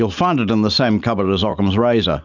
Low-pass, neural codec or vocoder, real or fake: 7.2 kHz; none; real